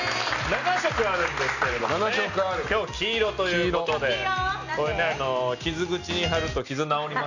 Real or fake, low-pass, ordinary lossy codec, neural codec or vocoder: real; 7.2 kHz; none; none